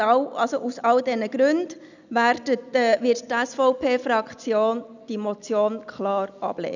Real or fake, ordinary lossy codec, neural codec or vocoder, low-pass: real; none; none; 7.2 kHz